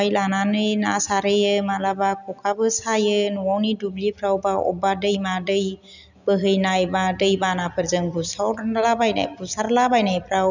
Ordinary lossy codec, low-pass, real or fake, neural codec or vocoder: none; 7.2 kHz; real; none